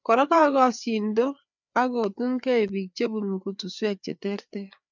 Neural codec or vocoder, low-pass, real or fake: codec, 16 kHz, 4 kbps, FreqCodec, larger model; 7.2 kHz; fake